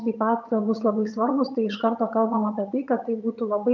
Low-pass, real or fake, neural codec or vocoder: 7.2 kHz; fake; vocoder, 22.05 kHz, 80 mel bands, HiFi-GAN